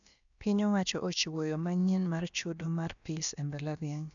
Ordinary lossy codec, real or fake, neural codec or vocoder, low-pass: none; fake; codec, 16 kHz, about 1 kbps, DyCAST, with the encoder's durations; 7.2 kHz